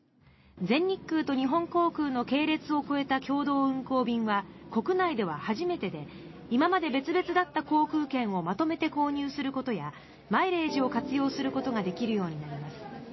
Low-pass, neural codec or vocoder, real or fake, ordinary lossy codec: 7.2 kHz; none; real; MP3, 24 kbps